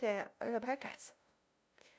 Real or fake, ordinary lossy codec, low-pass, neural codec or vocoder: fake; none; none; codec, 16 kHz, 0.5 kbps, FunCodec, trained on LibriTTS, 25 frames a second